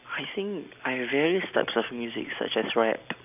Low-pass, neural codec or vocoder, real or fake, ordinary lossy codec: 3.6 kHz; none; real; none